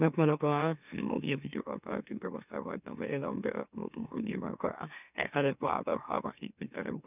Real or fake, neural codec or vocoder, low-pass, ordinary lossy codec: fake; autoencoder, 44.1 kHz, a latent of 192 numbers a frame, MeloTTS; 3.6 kHz; none